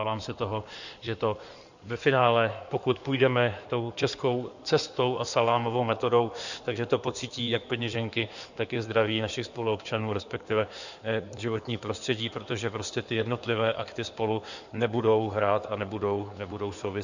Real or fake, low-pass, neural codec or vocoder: fake; 7.2 kHz; codec, 16 kHz in and 24 kHz out, 2.2 kbps, FireRedTTS-2 codec